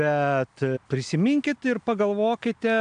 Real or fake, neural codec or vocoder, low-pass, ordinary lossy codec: real; none; 9.9 kHz; AAC, 96 kbps